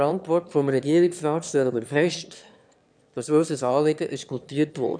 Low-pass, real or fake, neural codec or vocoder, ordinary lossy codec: 9.9 kHz; fake; autoencoder, 22.05 kHz, a latent of 192 numbers a frame, VITS, trained on one speaker; none